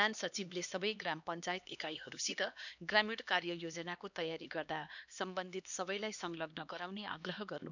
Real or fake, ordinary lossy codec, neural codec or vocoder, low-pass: fake; none; codec, 16 kHz, 2 kbps, X-Codec, HuBERT features, trained on LibriSpeech; 7.2 kHz